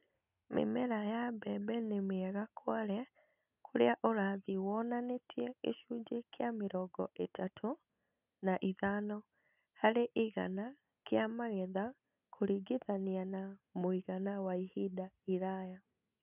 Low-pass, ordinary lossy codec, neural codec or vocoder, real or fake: 3.6 kHz; none; none; real